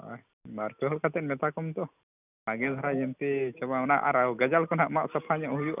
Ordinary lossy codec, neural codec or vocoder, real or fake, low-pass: none; none; real; 3.6 kHz